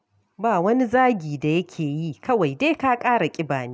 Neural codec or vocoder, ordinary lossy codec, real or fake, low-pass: none; none; real; none